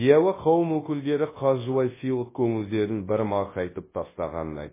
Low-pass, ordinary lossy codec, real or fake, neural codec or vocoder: 3.6 kHz; MP3, 16 kbps; fake; codec, 24 kHz, 0.9 kbps, WavTokenizer, large speech release